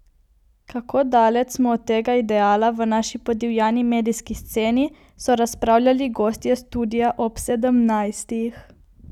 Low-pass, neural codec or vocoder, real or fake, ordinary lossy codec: 19.8 kHz; none; real; none